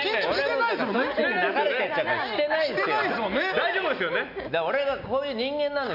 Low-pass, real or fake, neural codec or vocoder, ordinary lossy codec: 5.4 kHz; real; none; none